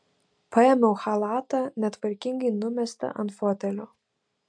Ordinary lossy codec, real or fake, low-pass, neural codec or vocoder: MP3, 64 kbps; real; 9.9 kHz; none